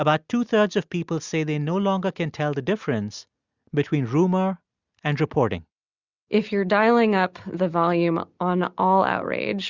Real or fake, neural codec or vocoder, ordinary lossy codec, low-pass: real; none; Opus, 64 kbps; 7.2 kHz